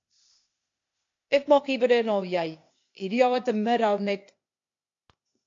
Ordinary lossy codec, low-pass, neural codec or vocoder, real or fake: AAC, 48 kbps; 7.2 kHz; codec, 16 kHz, 0.8 kbps, ZipCodec; fake